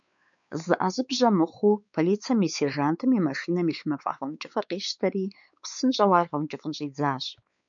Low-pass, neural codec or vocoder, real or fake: 7.2 kHz; codec, 16 kHz, 4 kbps, X-Codec, WavLM features, trained on Multilingual LibriSpeech; fake